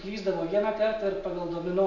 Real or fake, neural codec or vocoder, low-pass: real; none; 7.2 kHz